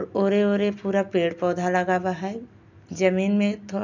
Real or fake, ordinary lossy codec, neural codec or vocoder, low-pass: real; none; none; 7.2 kHz